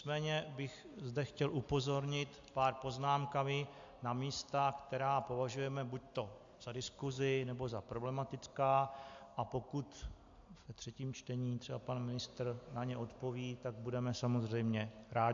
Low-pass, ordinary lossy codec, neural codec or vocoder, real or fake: 7.2 kHz; AAC, 64 kbps; none; real